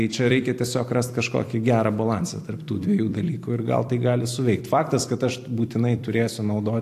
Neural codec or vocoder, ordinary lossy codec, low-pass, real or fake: vocoder, 44.1 kHz, 128 mel bands every 256 samples, BigVGAN v2; AAC, 64 kbps; 14.4 kHz; fake